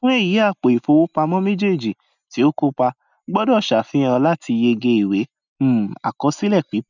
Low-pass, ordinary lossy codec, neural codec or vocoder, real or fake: 7.2 kHz; none; none; real